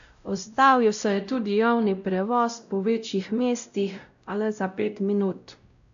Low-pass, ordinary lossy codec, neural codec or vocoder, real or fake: 7.2 kHz; AAC, 64 kbps; codec, 16 kHz, 0.5 kbps, X-Codec, WavLM features, trained on Multilingual LibriSpeech; fake